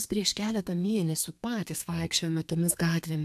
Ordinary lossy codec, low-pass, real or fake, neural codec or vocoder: AAC, 96 kbps; 14.4 kHz; fake; codec, 32 kHz, 1.9 kbps, SNAC